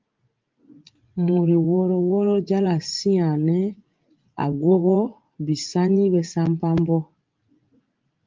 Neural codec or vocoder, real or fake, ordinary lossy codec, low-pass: vocoder, 44.1 kHz, 80 mel bands, Vocos; fake; Opus, 32 kbps; 7.2 kHz